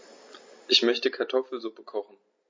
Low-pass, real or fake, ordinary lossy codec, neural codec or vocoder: 7.2 kHz; real; MP3, 32 kbps; none